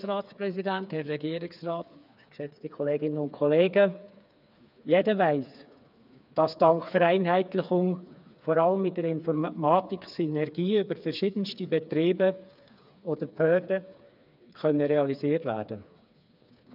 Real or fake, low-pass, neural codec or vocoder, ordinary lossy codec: fake; 5.4 kHz; codec, 16 kHz, 4 kbps, FreqCodec, smaller model; none